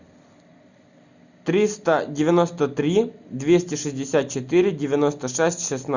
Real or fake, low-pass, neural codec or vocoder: real; 7.2 kHz; none